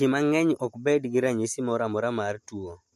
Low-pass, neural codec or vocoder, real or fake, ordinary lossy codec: 14.4 kHz; none; real; MP3, 64 kbps